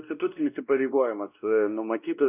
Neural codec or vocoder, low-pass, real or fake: codec, 16 kHz, 1 kbps, X-Codec, WavLM features, trained on Multilingual LibriSpeech; 3.6 kHz; fake